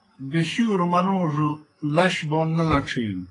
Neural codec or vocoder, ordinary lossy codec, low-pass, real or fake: codec, 32 kHz, 1.9 kbps, SNAC; AAC, 32 kbps; 10.8 kHz; fake